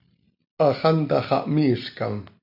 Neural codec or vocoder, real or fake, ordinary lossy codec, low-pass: none; real; AAC, 48 kbps; 5.4 kHz